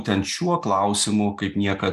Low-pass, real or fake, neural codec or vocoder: 14.4 kHz; real; none